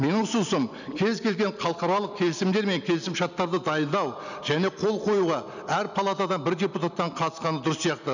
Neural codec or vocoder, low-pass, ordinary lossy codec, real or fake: none; 7.2 kHz; none; real